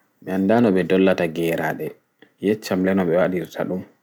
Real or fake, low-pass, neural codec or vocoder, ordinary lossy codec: real; none; none; none